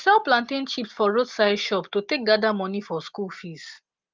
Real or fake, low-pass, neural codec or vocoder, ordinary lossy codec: real; 7.2 kHz; none; Opus, 32 kbps